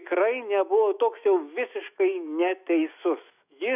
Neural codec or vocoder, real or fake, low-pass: autoencoder, 48 kHz, 128 numbers a frame, DAC-VAE, trained on Japanese speech; fake; 3.6 kHz